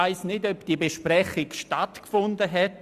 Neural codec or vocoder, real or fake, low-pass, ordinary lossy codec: none; real; 14.4 kHz; Opus, 64 kbps